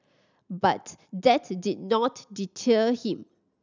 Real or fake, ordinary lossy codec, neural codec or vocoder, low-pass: real; none; none; 7.2 kHz